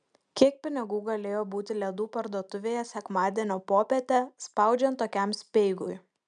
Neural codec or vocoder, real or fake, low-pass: none; real; 9.9 kHz